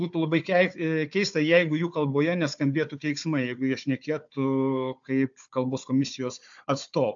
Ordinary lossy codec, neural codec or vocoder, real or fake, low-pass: AAC, 64 kbps; codec, 16 kHz, 4 kbps, FunCodec, trained on Chinese and English, 50 frames a second; fake; 7.2 kHz